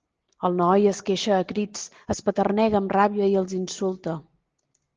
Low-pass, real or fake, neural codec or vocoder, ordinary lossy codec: 7.2 kHz; real; none; Opus, 16 kbps